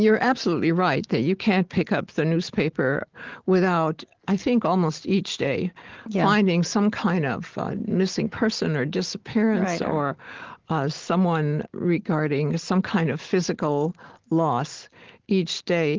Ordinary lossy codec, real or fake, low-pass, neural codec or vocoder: Opus, 16 kbps; real; 7.2 kHz; none